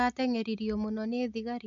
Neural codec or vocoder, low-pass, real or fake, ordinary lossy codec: none; 7.2 kHz; real; none